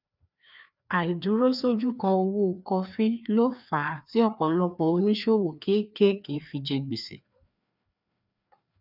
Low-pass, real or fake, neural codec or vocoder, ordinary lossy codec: 5.4 kHz; fake; codec, 16 kHz, 2 kbps, FreqCodec, larger model; none